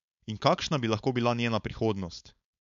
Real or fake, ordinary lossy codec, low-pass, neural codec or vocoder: fake; MP3, 64 kbps; 7.2 kHz; codec, 16 kHz, 4.8 kbps, FACodec